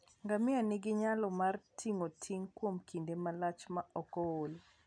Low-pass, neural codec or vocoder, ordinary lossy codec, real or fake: 9.9 kHz; none; none; real